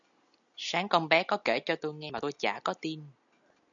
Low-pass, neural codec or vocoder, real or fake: 7.2 kHz; none; real